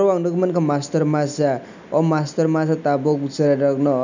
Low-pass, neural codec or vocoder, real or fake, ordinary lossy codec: 7.2 kHz; none; real; none